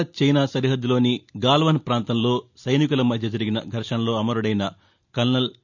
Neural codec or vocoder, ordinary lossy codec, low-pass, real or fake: none; none; 7.2 kHz; real